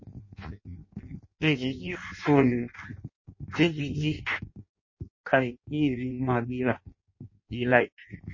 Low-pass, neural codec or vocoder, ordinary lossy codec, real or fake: 7.2 kHz; codec, 16 kHz in and 24 kHz out, 0.6 kbps, FireRedTTS-2 codec; MP3, 32 kbps; fake